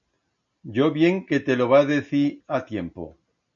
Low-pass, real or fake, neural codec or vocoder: 7.2 kHz; real; none